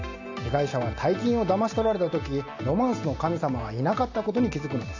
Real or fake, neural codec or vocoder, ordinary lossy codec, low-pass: real; none; AAC, 48 kbps; 7.2 kHz